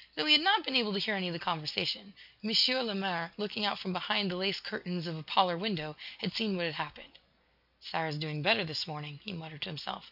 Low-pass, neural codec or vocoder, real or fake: 5.4 kHz; none; real